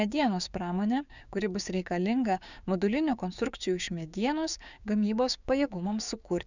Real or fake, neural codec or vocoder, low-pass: fake; codec, 16 kHz, 6 kbps, DAC; 7.2 kHz